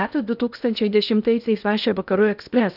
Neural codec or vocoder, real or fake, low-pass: codec, 16 kHz in and 24 kHz out, 0.8 kbps, FocalCodec, streaming, 65536 codes; fake; 5.4 kHz